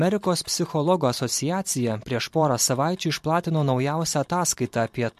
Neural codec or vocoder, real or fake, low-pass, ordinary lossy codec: vocoder, 44.1 kHz, 128 mel bands every 512 samples, BigVGAN v2; fake; 14.4 kHz; MP3, 64 kbps